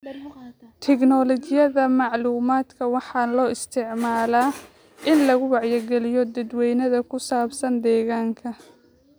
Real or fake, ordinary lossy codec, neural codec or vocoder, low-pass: real; none; none; none